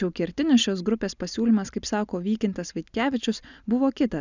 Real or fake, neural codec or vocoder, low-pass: real; none; 7.2 kHz